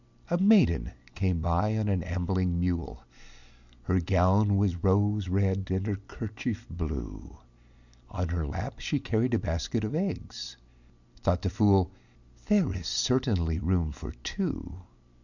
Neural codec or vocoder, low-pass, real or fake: none; 7.2 kHz; real